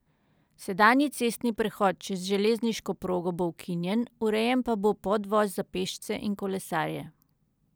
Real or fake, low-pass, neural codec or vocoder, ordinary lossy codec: real; none; none; none